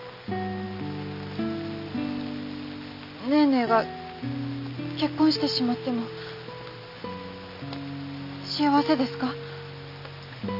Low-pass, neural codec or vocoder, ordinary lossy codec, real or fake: 5.4 kHz; none; none; real